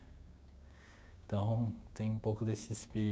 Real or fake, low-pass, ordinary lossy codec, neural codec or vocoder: fake; none; none; codec, 16 kHz, 6 kbps, DAC